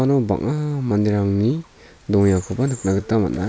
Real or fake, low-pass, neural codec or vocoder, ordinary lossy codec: real; none; none; none